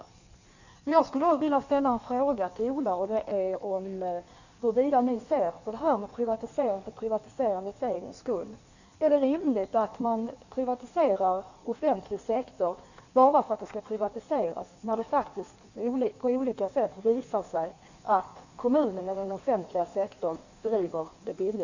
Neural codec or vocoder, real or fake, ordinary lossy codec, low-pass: codec, 16 kHz in and 24 kHz out, 1.1 kbps, FireRedTTS-2 codec; fake; none; 7.2 kHz